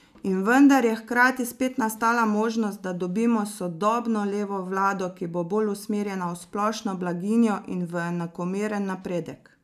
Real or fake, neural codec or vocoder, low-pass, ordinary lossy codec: real; none; 14.4 kHz; none